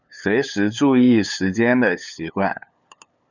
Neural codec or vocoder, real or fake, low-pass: codec, 16 kHz, 8 kbps, FunCodec, trained on LibriTTS, 25 frames a second; fake; 7.2 kHz